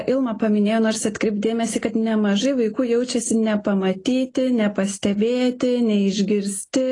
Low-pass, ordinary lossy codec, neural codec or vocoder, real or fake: 10.8 kHz; AAC, 32 kbps; none; real